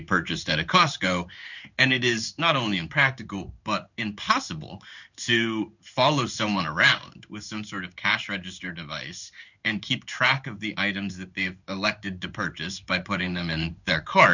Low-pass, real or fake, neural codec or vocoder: 7.2 kHz; fake; codec, 16 kHz in and 24 kHz out, 1 kbps, XY-Tokenizer